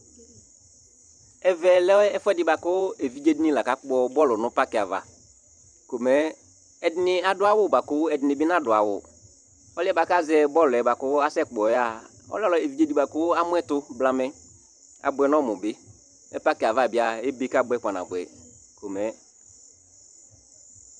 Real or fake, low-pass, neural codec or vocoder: fake; 9.9 kHz; vocoder, 44.1 kHz, 128 mel bands every 512 samples, BigVGAN v2